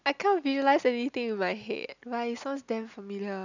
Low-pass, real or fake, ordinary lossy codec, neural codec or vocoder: 7.2 kHz; real; none; none